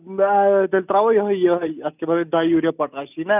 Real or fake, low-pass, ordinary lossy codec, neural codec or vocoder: real; 3.6 kHz; none; none